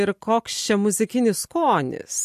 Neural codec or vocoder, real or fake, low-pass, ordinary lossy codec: none; real; 14.4 kHz; MP3, 64 kbps